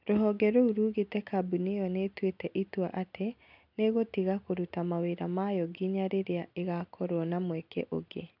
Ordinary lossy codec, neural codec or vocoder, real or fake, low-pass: none; none; real; 5.4 kHz